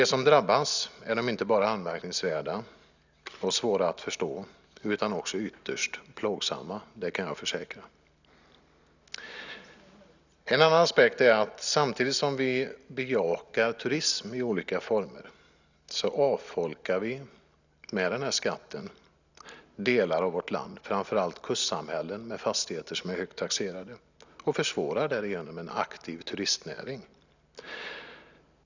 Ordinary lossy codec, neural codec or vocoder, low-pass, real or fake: none; none; 7.2 kHz; real